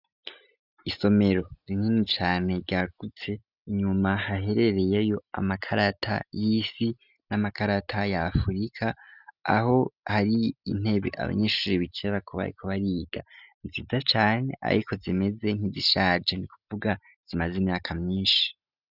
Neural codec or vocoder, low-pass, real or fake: none; 5.4 kHz; real